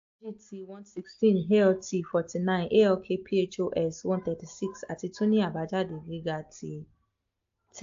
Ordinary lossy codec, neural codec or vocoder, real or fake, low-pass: AAC, 48 kbps; none; real; 7.2 kHz